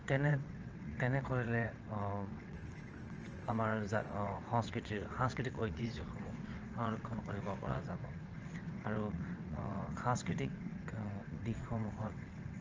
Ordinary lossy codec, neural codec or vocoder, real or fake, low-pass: Opus, 24 kbps; vocoder, 22.05 kHz, 80 mel bands, WaveNeXt; fake; 7.2 kHz